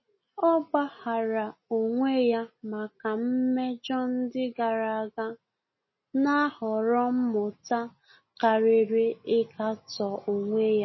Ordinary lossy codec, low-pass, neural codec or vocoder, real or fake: MP3, 24 kbps; 7.2 kHz; none; real